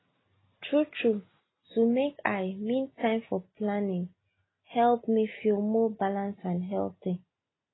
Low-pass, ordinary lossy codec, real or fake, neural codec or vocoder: 7.2 kHz; AAC, 16 kbps; real; none